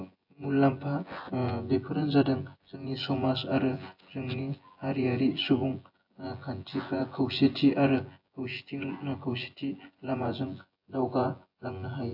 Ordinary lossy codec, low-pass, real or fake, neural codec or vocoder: AAC, 48 kbps; 5.4 kHz; fake; vocoder, 24 kHz, 100 mel bands, Vocos